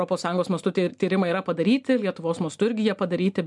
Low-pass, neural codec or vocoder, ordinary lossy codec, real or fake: 10.8 kHz; none; MP3, 96 kbps; real